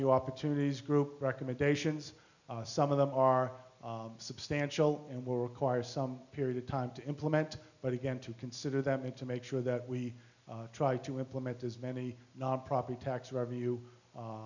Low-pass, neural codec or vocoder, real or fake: 7.2 kHz; none; real